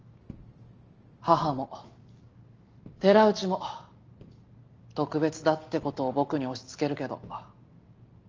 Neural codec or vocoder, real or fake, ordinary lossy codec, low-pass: none; real; Opus, 24 kbps; 7.2 kHz